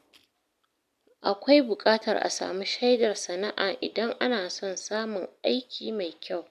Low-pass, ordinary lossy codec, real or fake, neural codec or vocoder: 14.4 kHz; AAC, 96 kbps; real; none